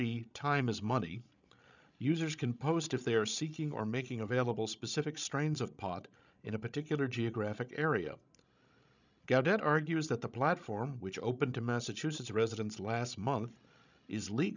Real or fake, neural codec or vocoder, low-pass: fake; codec, 16 kHz, 16 kbps, FreqCodec, larger model; 7.2 kHz